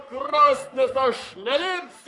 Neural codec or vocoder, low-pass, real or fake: codec, 44.1 kHz, 3.4 kbps, Pupu-Codec; 10.8 kHz; fake